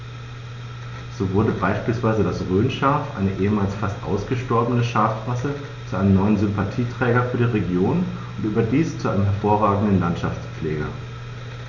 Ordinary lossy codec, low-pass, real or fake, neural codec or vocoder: none; 7.2 kHz; real; none